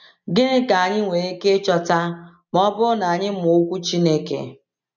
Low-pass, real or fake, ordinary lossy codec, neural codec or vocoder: 7.2 kHz; real; none; none